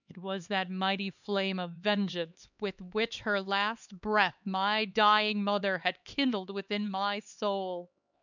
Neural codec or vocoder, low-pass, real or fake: codec, 16 kHz, 4 kbps, X-Codec, HuBERT features, trained on LibriSpeech; 7.2 kHz; fake